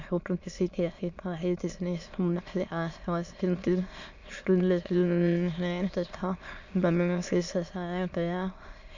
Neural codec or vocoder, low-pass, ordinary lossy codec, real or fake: autoencoder, 22.05 kHz, a latent of 192 numbers a frame, VITS, trained on many speakers; 7.2 kHz; Opus, 64 kbps; fake